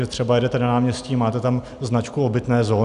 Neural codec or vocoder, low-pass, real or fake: none; 10.8 kHz; real